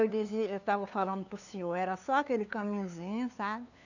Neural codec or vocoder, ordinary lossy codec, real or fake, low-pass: codec, 16 kHz, 2 kbps, FunCodec, trained on LibriTTS, 25 frames a second; none; fake; 7.2 kHz